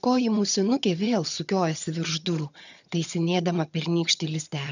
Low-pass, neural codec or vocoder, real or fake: 7.2 kHz; vocoder, 22.05 kHz, 80 mel bands, HiFi-GAN; fake